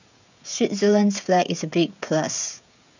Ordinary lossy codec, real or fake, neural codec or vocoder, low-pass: none; fake; codec, 16 kHz, 16 kbps, FreqCodec, smaller model; 7.2 kHz